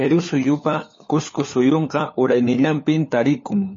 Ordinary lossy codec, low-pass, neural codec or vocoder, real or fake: MP3, 32 kbps; 7.2 kHz; codec, 16 kHz, 4 kbps, FunCodec, trained on LibriTTS, 50 frames a second; fake